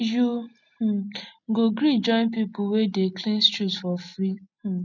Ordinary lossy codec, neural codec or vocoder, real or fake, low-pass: none; none; real; 7.2 kHz